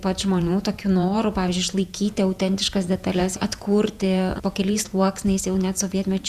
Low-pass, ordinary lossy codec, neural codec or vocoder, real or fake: 14.4 kHz; Opus, 64 kbps; vocoder, 48 kHz, 128 mel bands, Vocos; fake